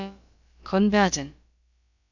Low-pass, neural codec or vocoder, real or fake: 7.2 kHz; codec, 16 kHz, about 1 kbps, DyCAST, with the encoder's durations; fake